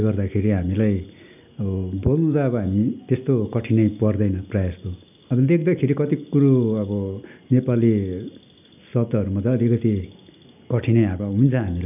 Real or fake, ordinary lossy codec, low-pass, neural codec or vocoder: real; none; 3.6 kHz; none